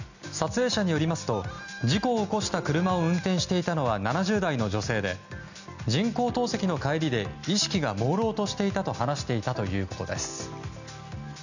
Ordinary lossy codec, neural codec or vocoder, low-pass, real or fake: none; none; 7.2 kHz; real